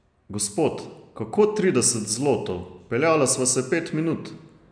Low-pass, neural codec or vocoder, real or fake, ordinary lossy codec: 9.9 kHz; none; real; MP3, 96 kbps